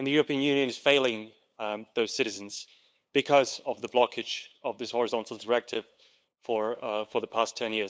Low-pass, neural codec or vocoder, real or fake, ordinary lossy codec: none; codec, 16 kHz, 8 kbps, FunCodec, trained on LibriTTS, 25 frames a second; fake; none